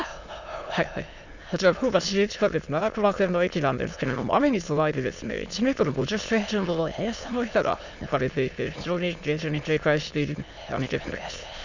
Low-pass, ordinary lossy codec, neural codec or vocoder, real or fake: 7.2 kHz; none; autoencoder, 22.05 kHz, a latent of 192 numbers a frame, VITS, trained on many speakers; fake